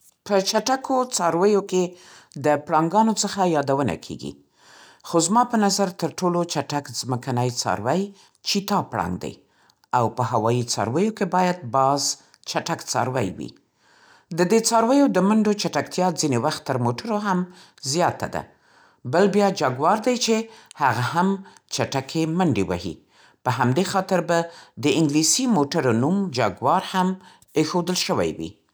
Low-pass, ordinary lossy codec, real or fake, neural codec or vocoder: none; none; real; none